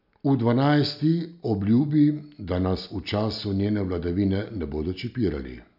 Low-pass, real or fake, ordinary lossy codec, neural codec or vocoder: 5.4 kHz; real; none; none